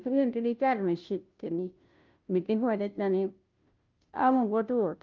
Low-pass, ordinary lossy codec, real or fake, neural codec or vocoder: 7.2 kHz; Opus, 24 kbps; fake; codec, 16 kHz, 0.5 kbps, FunCodec, trained on Chinese and English, 25 frames a second